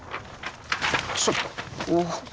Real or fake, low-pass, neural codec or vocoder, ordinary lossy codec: real; none; none; none